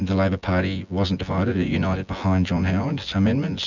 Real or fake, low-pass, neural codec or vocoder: fake; 7.2 kHz; vocoder, 24 kHz, 100 mel bands, Vocos